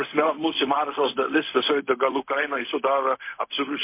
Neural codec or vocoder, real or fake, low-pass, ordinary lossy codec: codec, 16 kHz, 0.4 kbps, LongCat-Audio-Codec; fake; 3.6 kHz; MP3, 24 kbps